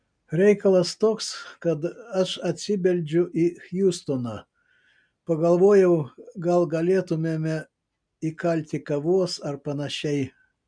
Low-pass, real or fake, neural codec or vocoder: 9.9 kHz; real; none